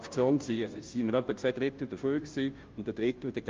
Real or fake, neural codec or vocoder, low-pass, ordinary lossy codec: fake; codec, 16 kHz, 0.5 kbps, FunCodec, trained on Chinese and English, 25 frames a second; 7.2 kHz; Opus, 32 kbps